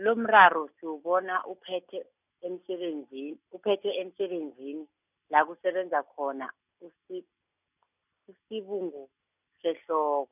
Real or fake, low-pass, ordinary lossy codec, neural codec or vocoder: real; 3.6 kHz; none; none